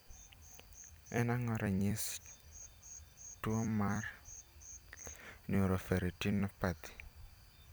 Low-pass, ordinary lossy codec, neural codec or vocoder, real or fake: none; none; vocoder, 44.1 kHz, 128 mel bands every 256 samples, BigVGAN v2; fake